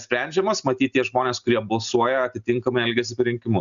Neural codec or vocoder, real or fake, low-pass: none; real; 7.2 kHz